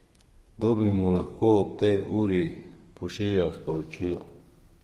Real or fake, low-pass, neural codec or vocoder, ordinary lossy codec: fake; 14.4 kHz; codec, 32 kHz, 1.9 kbps, SNAC; Opus, 24 kbps